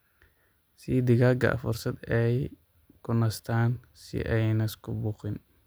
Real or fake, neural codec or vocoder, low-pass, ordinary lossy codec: real; none; none; none